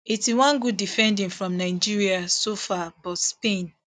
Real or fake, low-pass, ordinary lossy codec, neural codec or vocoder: real; none; none; none